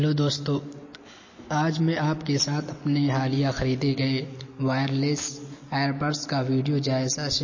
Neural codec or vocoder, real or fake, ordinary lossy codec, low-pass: none; real; MP3, 32 kbps; 7.2 kHz